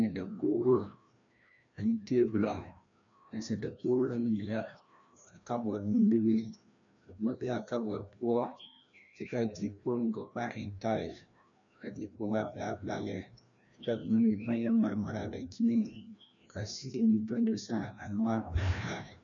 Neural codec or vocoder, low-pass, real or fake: codec, 16 kHz, 1 kbps, FreqCodec, larger model; 7.2 kHz; fake